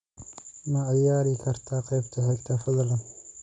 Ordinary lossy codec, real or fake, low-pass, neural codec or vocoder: none; real; 10.8 kHz; none